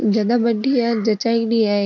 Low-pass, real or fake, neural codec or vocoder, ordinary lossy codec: 7.2 kHz; real; none; none